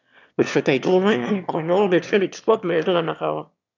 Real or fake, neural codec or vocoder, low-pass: fake; autoencoder, 22.05 kHz, a latent of 192 numbers a frame, VITS, trained on one speaker; 7.2 kHz